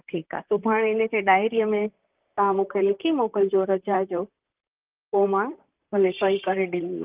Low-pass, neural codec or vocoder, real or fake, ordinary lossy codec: 3.6 kHz; vocoder, 44.1 kHz, 128 mel bands, Pupu-Vocoder; fake; Opus, 32 kbps